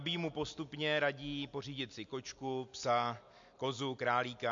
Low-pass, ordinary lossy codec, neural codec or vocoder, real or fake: 7.2 kHz; MP3, 48 kbps; none; real